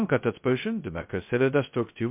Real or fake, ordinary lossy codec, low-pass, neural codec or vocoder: fake; MP3, 32 kbps; 3.6 kHz; codec, 16 kHz, 0.2 kbps, FocalCodec